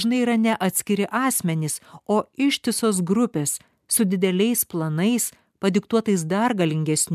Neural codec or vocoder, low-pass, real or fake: none; 14.4 kHz; real